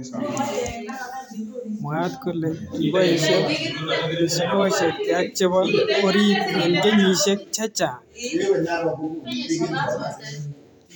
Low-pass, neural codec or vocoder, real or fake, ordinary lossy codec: none; vocoder, 44.1 kHz, 128 mel bands every 512 samples, BigVGAN v2; fake; none